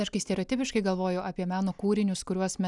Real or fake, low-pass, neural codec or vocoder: real; 10.8 kHz; none